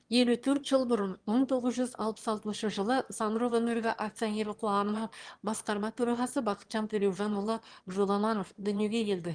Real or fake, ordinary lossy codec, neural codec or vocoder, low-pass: fake; Opus, 24 kbps; autoencoder, 22.05 kHz, a latent of 192 numbers a frame, VITS, trained on one speaker; 9.9 kHz